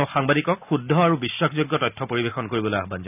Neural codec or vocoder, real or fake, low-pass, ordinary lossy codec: none; real; 3.6 kHz; none